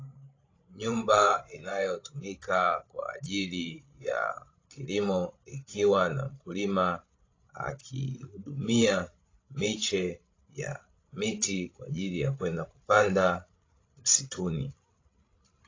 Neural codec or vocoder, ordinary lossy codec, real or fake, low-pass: codec, 16 kHz, 16 kbps, FreqCodec, larger model; AAC, 32 kbps; fake; 7.2 kHz